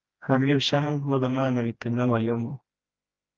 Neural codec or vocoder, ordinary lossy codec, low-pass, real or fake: codec, 16 kHz, 1 kbps, FreqCodec, smaller model; Opus, 24 kbps; 7.2 kHz; fake